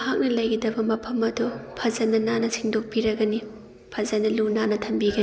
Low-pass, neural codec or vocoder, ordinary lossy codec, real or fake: none; none; none; real